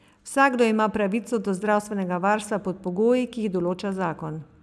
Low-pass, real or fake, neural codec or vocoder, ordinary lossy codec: none; real; none; none